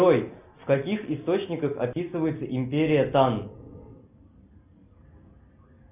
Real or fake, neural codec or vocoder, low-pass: real; none; 3.6 kHz